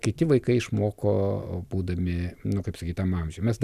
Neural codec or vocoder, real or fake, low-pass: none; real; 14.4 kHz